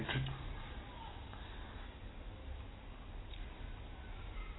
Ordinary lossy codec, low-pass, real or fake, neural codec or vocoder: AAC, 16 kbps; 7.2 kHz; real; none